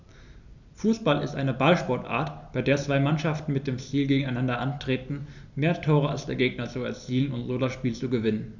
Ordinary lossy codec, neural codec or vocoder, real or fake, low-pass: none; none; real; 7.2 kHz